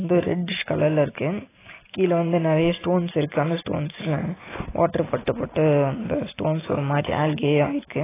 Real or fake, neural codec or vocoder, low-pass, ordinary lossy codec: real; none; 3.6 kHz; AAC, 16 kbps